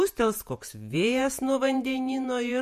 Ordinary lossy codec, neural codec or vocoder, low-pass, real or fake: MP3, 64 kbps; vocoder, 48 kHz, 128 mel bands, Vocos; 14.4 kHz; fake